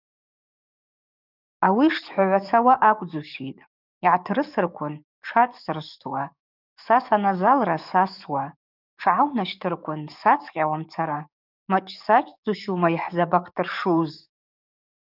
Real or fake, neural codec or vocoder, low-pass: fake; codec, 44.1 kHz, 7.8 kbps, DAC; 5.4 kHz